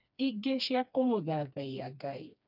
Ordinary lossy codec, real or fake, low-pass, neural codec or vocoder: none; fake; 5.4 kHz; codec, 16 kHz, 2 kbps, FreqCodec, smaller model